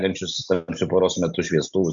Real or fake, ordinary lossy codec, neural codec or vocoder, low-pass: real; Opus, 64 kbps; none; 7.2 kHz